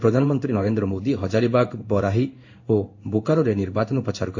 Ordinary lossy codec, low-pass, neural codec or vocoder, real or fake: AAC, 48 kbps; 7.2 kHz; codec, 16 kHz in and 24 kHz out, 1 kbps, XY-Tokenizer; fake